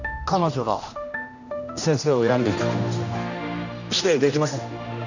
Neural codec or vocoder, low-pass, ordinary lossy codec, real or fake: codec, 16 kHz, 1 kbps, X-Codec, HuBERT features, trained on general audio; 7.2 kHz; none; fake